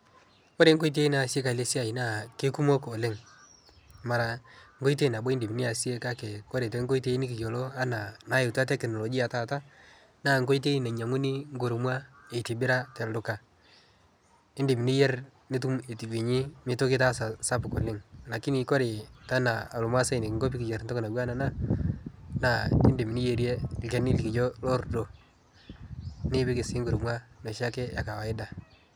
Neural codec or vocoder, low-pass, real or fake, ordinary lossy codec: none; none; real; none